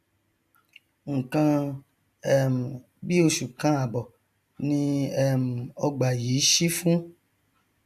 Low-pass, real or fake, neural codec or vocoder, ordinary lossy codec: 14.4 kHz; real; none; none